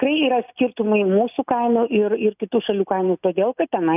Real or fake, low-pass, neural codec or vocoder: real; 3.6 kHz; none